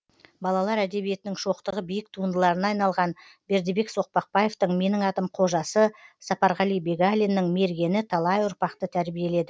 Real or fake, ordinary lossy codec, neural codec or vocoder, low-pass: real; none; none; none